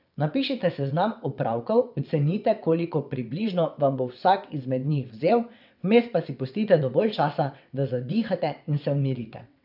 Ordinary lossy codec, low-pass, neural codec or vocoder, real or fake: none; 5.4 kHz; vocoder, 22.05 kHz, 80 mel bands, WaveNeXt; fake